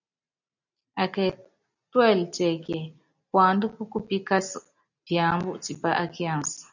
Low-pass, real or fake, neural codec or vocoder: 7.2 kHz; real; none